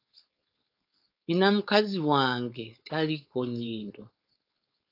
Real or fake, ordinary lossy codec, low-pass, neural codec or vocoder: fake; AAC, 32 kbps; 5.4 kHz; codec, 16 kHz, 4.8 kbps, FACodec